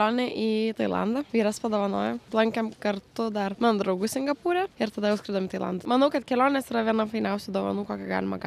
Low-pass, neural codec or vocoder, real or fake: 14.4 kHz; none; real